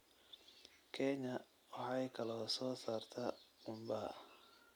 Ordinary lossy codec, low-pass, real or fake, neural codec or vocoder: none; none; real; none